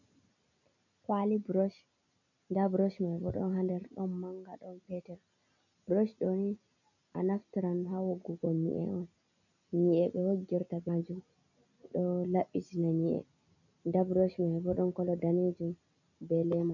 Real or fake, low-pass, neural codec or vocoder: real; 7.2 kHz; none